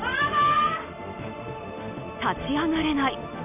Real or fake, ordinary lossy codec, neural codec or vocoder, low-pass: real; none; none; 3.6 kHz